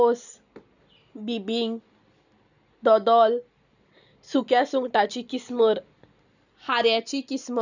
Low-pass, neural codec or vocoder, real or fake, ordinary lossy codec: 7.2 kHz; none; real; none